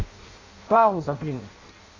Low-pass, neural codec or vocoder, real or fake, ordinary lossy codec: 7.2 kHz; codec, 16 kHz in and 24 kHz out, 0.6 kbps, FireRedTTS-2 codec; fake; AAC, 32 kbps